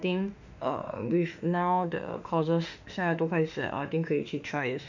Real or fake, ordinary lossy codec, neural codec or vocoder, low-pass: fake; none; autoencoder, 48 kHz, 32 numbers a frame, DAC-VAE, trained on Japanese speech; 7.2 kHz